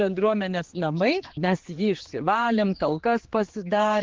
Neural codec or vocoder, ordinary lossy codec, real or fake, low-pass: codec, 16 kHz, 4 kbps, X-Codec, HuBERT features, trained on general audio; Opus, 16 kbps; fake; 7.2 kHz